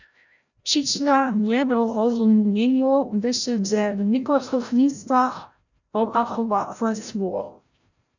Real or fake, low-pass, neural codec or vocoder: fake; 7.2 kHz; codec, 16 kHz, 0.5 kbps, FreqCodec, larger model